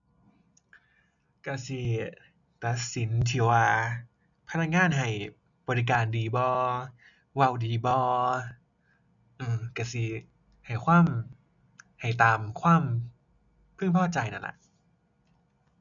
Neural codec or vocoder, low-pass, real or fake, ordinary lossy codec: none; 7.2 kHz; real; none